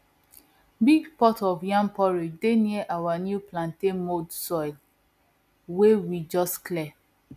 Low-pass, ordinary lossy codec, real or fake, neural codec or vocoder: 14.4 kHz; none; real; none